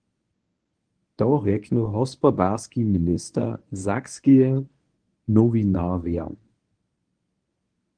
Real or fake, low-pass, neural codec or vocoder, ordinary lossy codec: fake; 9.9 kHz; codec, 24 kHz, 0.9 kbps, WavTokenizer, medium speech release version 1; Opus, 24 kbps